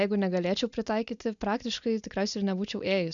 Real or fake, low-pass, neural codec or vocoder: real; 7.2 kHz; none